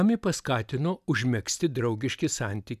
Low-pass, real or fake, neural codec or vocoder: 14.4 kHz; real; none